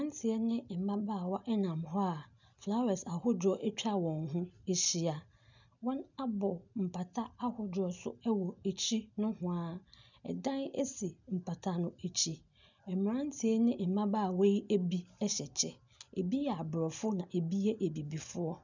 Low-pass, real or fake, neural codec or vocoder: 7.2 kHz; real; none